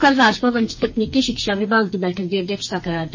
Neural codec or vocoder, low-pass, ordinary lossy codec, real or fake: codec, 44.1 kHz, 2.6 kbps, SNAC; 7.2 kHz; MP3, 32 kbps; fake